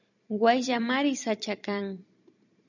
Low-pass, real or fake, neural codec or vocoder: 7.2 kHz; fake; vocoder, 44.1 kHz, 128 mel bands every 256 samples, BigVGAN v2